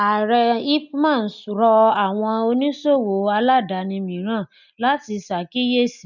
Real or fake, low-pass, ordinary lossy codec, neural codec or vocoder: real; 7.2 kHz; none; none